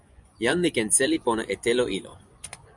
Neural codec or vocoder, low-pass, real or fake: vocoder, 24 kHz, 100 mel bands, Vocos; 10.8 kHz; fake